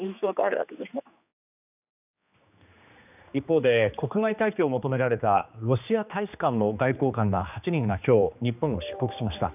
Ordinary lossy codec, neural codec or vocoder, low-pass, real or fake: none; codec, 16 kHz, 2 kbps, X-Codec, HuBERT features, trained on general audio; 3.6 kHz; fake